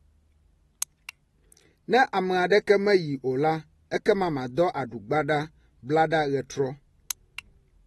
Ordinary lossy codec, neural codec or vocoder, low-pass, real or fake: AAC, 32 kbps; none; 19.8 kHz; real